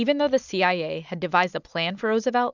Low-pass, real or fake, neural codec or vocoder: 7.2 kHz; real; none